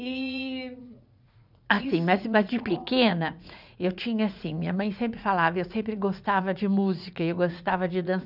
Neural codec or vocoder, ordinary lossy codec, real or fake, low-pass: vocoder, 44.1 kHz, 80 mel bands, Vocos; none; fake; 5.4 kHz